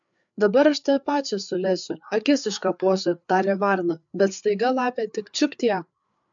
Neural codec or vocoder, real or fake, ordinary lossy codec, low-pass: codec, 16 kHz, 4 kbps, FreqCodec, larger model; fake; MP3, 64 kbps; 7.2 kHz